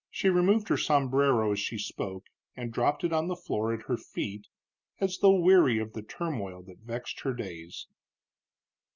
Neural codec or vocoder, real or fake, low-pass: none; real; 7.2 kHz